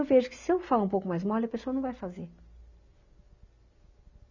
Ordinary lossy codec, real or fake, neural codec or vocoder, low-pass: none; real; none; 7.2 kHz